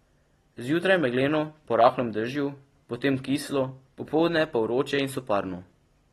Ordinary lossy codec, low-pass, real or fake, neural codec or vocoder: AAC, 32 kbps; 19.8 kHz; real; none